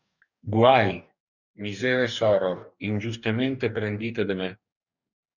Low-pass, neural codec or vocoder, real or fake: 7.2 kHz; codec, 44.1 kHz, 2.6 kbps, DAC; fake